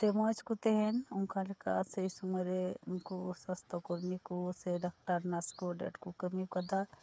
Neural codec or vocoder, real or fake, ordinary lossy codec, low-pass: codec, 16 kHz, 8 kbps, FreqCodec, smaller model; fake; none; none